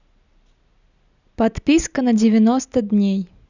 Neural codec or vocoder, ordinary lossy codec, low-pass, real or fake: none; none; 7.2 kHz; real